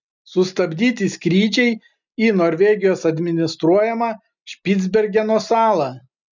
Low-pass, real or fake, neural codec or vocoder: 7.2 kHz; real; none